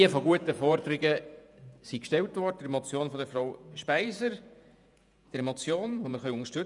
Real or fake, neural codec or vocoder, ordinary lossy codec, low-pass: fake; vocoder, 48 kHz, 128 mel bands, Vocos; none; 10.8 kHz